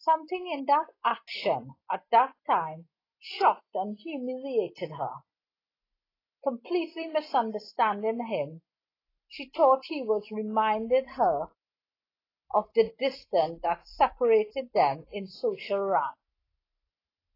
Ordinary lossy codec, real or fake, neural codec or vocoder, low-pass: AAC, 24 kbps; real; none; 5.4 kHz